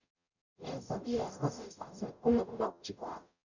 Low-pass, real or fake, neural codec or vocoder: 7.2 kHz; fake; codec, 44.1 kHz, 0.9 kbps, DAC